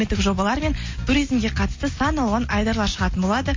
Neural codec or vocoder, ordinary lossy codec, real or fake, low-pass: none; MP3, 32 kbps; real; 7.2 kHz